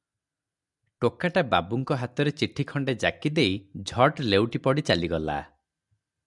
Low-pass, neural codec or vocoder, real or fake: 10.8 kHz; none; real